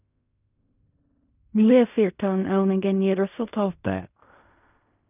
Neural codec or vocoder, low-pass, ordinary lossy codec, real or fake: codec, 16 kHz in and 24 kHz out, 0.4 kbps, LongCat-Audio-Codec, fine tuned four codebook decoder; 3.6 kHz; none; fake